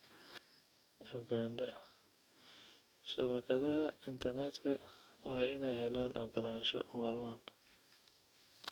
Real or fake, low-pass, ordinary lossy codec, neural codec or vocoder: fake; 19.8 kHz; none; codec, 44.1 kHz, 2.6 kbps, DAC